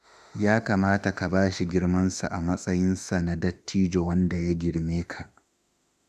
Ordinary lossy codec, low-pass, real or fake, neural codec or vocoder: none; 14.4 kHz; fake; autoencoder, 48 kHz, 32 numbers a frame, DAC-VAE, trained on Japanese speech